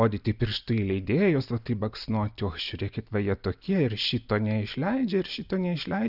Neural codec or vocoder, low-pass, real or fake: none; 5.4 kHz; real